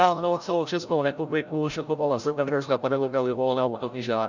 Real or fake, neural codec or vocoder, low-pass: fake; codec, 16 kHz, 0.5 kbps, FreqCodec, larger model; 7.2 kHz